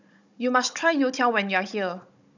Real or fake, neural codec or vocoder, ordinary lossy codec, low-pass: fake; codec, 16 kHz, 16 kbps, FunCodec, trained on Chinese and English, 50 frames a second; none; 7.2 kHz